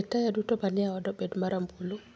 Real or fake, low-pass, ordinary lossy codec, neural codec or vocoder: real; none; none; none